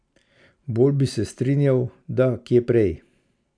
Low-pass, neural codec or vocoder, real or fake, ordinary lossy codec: 9.9 kHz; none; real; none